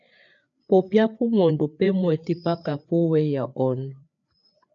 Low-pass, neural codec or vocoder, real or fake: 7.2 kHz; codec, 16 kHz, 4 kbps, FreqCodec, larger model; fake